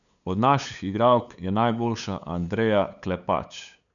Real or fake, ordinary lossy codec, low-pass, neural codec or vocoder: fake; none; 7.2 kHz; codec, 16 kHz, 8 kbps, FunCodec, trained on LibriTTS, 25 frames a second